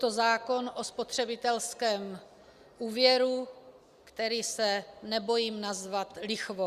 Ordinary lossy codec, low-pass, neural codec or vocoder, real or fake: Opus, 64 kbps; 14.4 kHz; none; real